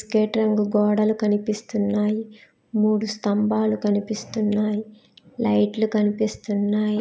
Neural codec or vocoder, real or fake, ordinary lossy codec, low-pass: none; real; none; none